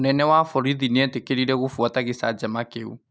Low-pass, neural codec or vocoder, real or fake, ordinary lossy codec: none; none; real; none